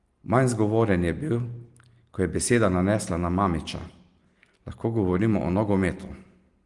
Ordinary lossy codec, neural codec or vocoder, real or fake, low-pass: Opus, 24 kbps; none; real; 10.8 kHz